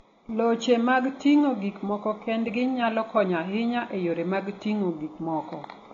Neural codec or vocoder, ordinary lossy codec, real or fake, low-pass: none; AAC, 32 kbps; real; 7.2 kHz